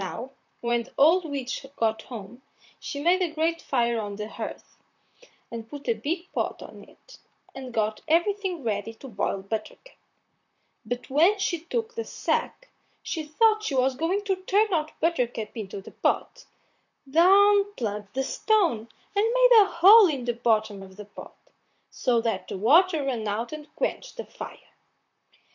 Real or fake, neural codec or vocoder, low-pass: fake; vocoder, 44.1 kHz, 128 mel bands, Pupu-Vocoder; 7.2 kHz